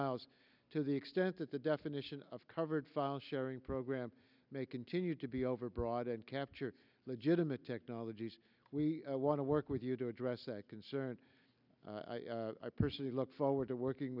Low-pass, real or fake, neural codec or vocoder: 5.4 kHz; real; none